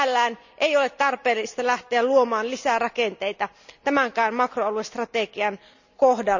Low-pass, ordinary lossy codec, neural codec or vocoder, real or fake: 7.2 kHz; none; none; real